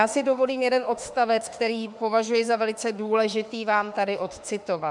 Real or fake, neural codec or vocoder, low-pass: fake; autoencoder, 48 kHz, 32 numbers a frame, DAC-VAE, trained on Japanese speech; 10.8 kHz